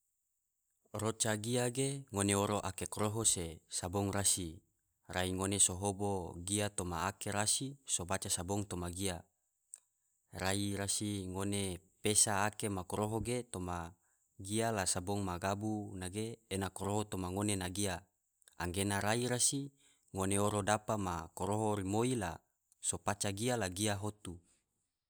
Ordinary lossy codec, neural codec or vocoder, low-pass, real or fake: none; none; none; real